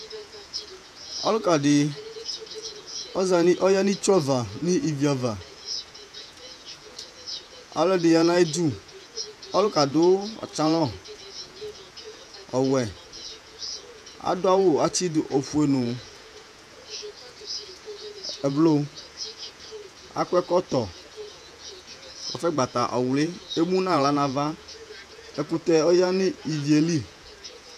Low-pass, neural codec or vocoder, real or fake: 14.4 kHz; vocoder, 48 kHz, 128 mel bands, Vocos; fake